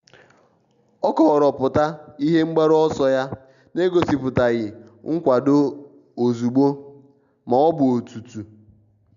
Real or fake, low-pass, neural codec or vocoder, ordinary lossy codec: real; 7.2 kHz; none; none